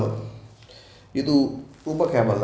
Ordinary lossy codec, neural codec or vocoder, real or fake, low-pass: none; none; real; none